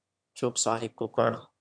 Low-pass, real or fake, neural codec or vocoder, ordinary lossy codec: 9.9 kHz; fake; autoencoder, 22.05 kHz, a latent of 192 numbers a frame, VITS, trained on one speaker; MP3, 64 kbps